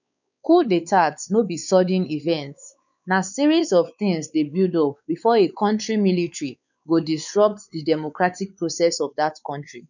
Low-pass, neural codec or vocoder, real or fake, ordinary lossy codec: 7.2 kHz; codec, 16 kHz, 4 kbps, X-Codec, WavLM features, trained on Multilingual LibriSpeech; fake; none